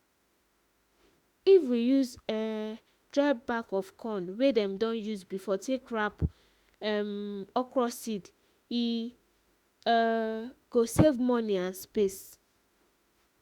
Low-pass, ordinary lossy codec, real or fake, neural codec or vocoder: 19.8 kHz; Opus, 64 kbps; fake; autoencoder, 48 kHz, 32 numbers a frame, DAC-VAE, trained on Japanese speech